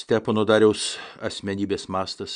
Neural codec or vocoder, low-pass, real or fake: none; 9.9 kHz; real